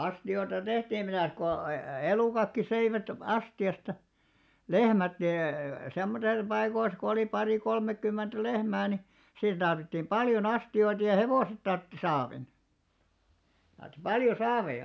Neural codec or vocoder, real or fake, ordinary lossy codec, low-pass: none; real; none; none